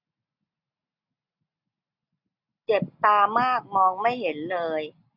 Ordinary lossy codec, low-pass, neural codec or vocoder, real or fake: none; 5.4 kHz; none; real